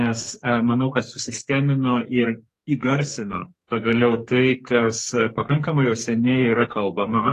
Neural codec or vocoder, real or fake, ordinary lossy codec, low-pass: codec, 44.1 kHz, 2.6 kbps, SNAC; fake; AAC, 48 kbps; 14.4 kHz